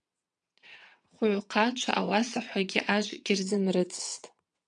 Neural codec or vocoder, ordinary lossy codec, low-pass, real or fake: vocoder, 22.05 kHz, 80 mel bands, WaveNeXt; MP3, 96 kbps; 9.9 kHz; fake